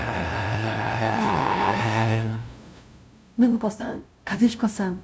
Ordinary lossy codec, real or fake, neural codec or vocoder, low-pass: none; fake; codec, 16 kHz, 0.5 kbps, FunCodec, trained on LibriTTS, 25 frames a second; none